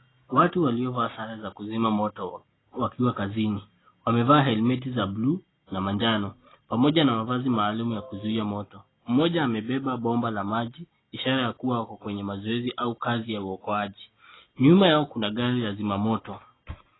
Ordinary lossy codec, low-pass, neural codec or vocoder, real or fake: AAC, 16 kbps; 7.2 kHz; none; real